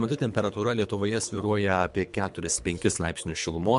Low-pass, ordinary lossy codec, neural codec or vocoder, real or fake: 10.8 kHz; MP3, 64 kbps; codec, 24 kHz, 3 kbps, HILCodec; fake